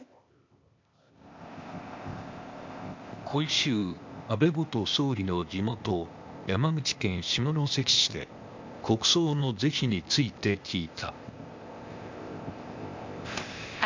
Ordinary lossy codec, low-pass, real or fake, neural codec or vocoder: MP3, 64 kbps; 7.2 kHz; fake; codec, 16 kHz, 0.8 kbps, ZipCodec